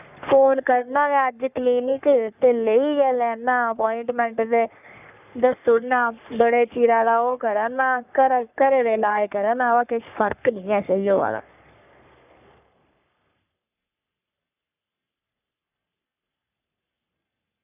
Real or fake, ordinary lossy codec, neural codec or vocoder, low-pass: fake; none; codec, 44.1 kHz, 3.4 kbps, Pupu-Codec; 3.6 kHz